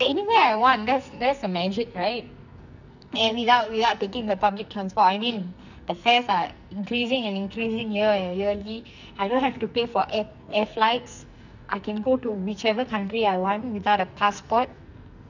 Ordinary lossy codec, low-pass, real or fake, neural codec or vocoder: none; 7.2 kHz; fake; codec, 44.1 kHz, 2.6 kbps, SNAC